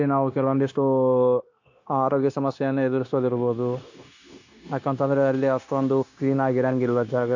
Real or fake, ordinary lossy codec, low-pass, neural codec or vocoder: fake; AAC, 48 kbps; 7.2 kHz; codec, 16 kHz, 0.9 kbps, LongCat-Audio-Codec